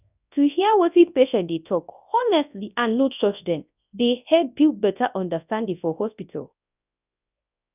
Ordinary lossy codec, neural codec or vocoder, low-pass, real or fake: none; codec, 24 kHz, 0.9 kbps, WavTokenizer, large speech release; 3.6 kHz; fake